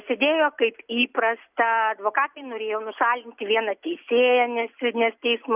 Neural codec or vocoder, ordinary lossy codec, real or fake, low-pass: none; Opus, 64 kbps; real; 3.6 kHz